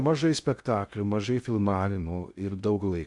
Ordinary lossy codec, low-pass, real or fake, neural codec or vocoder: MP3, 96 kbps; 10.8 kHz; fake; codec, 16 kHz in and 24 kHz out, 0.6 kbps, FocalCodec, streaming, 2048 codes